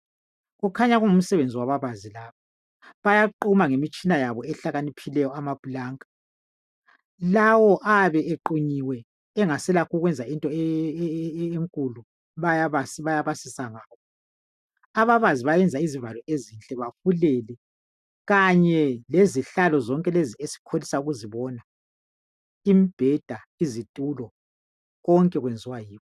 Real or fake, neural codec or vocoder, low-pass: real; none; 14.4 kHz